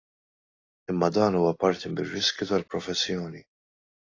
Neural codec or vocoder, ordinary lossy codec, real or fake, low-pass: none; AAC, 32 kbps; real; 7.2 kHz